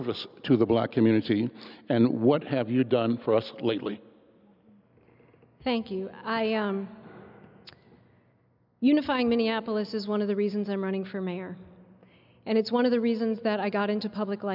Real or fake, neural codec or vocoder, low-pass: real; none; 5.4 kHz